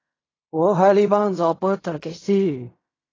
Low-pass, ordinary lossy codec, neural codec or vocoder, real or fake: 7.2 kHz; AAC, 32 kbps; codec, 16 kHz in and 24 kHz out, 0.4 kbps, LongCat-Audio-Codec, fine tuned four codebook decoder; fake